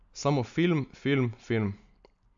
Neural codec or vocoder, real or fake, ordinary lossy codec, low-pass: none; real; AAC, 64 kbps; 7.2 kHz